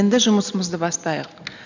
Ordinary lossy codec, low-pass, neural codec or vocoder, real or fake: none; 7.2 kHz; none; real